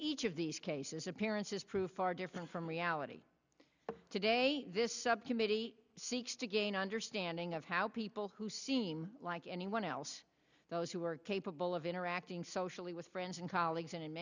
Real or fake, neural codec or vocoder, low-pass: real; none; 7.2 kHz